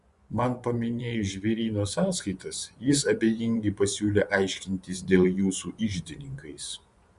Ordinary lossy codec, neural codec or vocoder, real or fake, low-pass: Opus, 64 kbps; vocoder, 24 kHz, 100 mel bands, Vocos; fake; 10.8 kHz